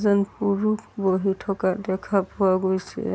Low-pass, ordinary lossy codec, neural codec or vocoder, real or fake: none; none; none; real